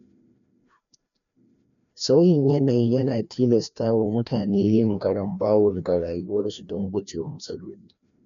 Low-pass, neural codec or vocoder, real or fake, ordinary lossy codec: 7.2 kHz; codec, 16 kHz, 1 kbps, FreqCodec, larger model; fake; none